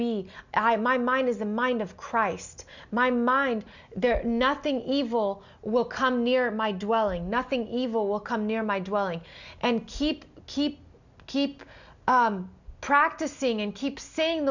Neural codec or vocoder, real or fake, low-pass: none; real; 7.2 kHz